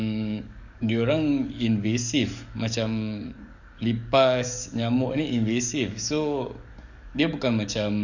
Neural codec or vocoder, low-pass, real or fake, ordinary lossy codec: codec, 44.1 kHz, 7.8 kbps, DAC; 7.2 kHz; fake; none